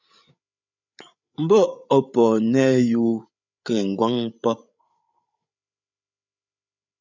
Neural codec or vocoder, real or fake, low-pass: codec, 16 kHz, 8 kbps, FreqCodec, larger model; fake; 7.2 kHz